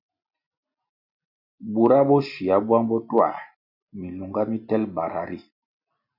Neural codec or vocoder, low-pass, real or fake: none; 5.4 kHz; real